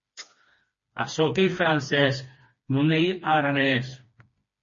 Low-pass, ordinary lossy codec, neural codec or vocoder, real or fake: 7.2 kHz; MP3, 32 kbps; codec, 16 kHz, 2 kbps, FreqCodec, smaller model; fake